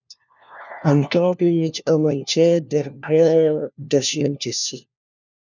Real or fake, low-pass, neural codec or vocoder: fake; 7.2 kHz; codec, 16 kHz, 1 kbps, FunCodec, trained on LibriTTS, 50 frames a second